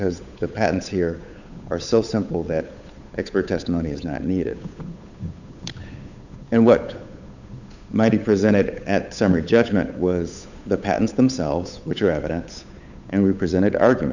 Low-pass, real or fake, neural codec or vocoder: 7.2 kHz; fake; codec, 16 kHz, 8 kbps, FunCodec, trained on Chinese and English, 25 frames a second